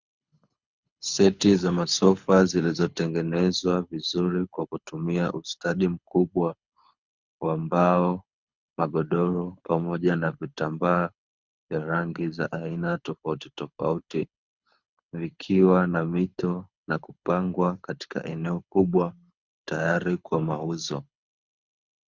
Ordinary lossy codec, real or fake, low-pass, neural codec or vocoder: Opus, 64 kbps; fake; 7.2 kHz; codec, 24 kHz, 6 kbps, HILCodec